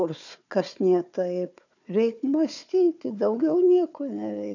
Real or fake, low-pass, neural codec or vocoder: fake; 7.2 kHz; codec, 16 kHz, 4 kbps, FunCodec, trained on Chinese and English, 50 frames a second